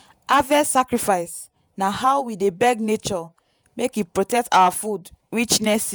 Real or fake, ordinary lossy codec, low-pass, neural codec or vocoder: fake; none; none; vocoder, 48 kHz, 128 mel bands, Vocos